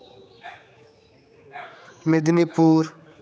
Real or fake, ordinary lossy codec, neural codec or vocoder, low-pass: fake; none; codec, 16 kHz, 4 kbps, X-Codec, HuBERT features, trained on general audio; none